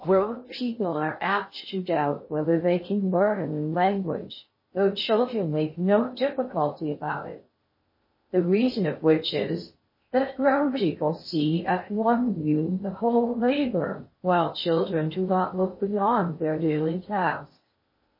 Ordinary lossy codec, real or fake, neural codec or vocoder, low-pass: MP3, 24 kbps; fake; codec, 16 kHz in and 24 kHz out, 0.6 kbps, FocalCodec, streaming, 2048 codes; 5.4 kHz